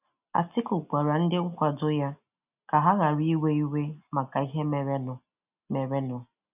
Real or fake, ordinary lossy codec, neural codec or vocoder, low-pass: real; none; none; 3.6 kHz